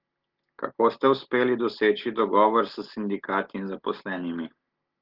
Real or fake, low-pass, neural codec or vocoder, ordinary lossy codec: real; 5.4 kHz; none; Opus, 16 kbps